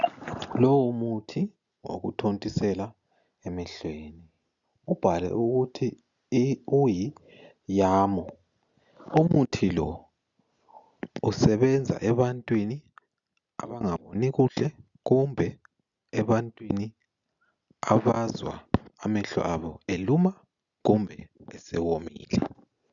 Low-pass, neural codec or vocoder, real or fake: 7.2 kHz; none; real